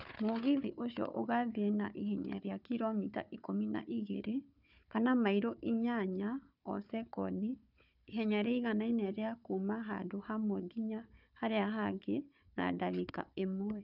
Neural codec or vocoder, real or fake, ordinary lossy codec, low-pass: codec, 16 kHz, 4 kbps, FunCodec, trained on Chinese and English, 50 frames a second; fake; none; 5.4 kHz